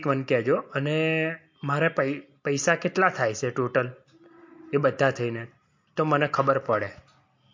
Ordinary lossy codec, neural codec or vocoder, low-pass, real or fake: MP3, 48 kbps; none; 7.2 kHz; real